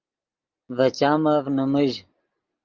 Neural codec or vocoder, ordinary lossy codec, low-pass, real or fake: none; Opus, 32 kbps; 7.2 kHz; real